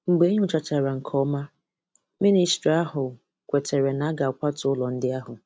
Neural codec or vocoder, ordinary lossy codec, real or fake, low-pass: none; none; real; none